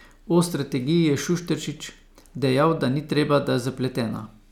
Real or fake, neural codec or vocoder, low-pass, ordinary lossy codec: real; none; 19.8 kHz; none